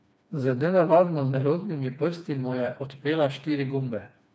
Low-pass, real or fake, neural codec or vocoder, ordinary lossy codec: none; fake; codec, 16 kHz, 2 kbps, FreqCodec, smaller model; none